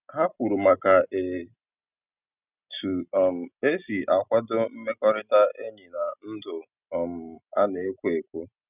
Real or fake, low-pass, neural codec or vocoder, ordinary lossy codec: real; 3.6 kHz; none; none